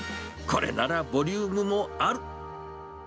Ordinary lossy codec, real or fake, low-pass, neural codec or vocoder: none; real; none; none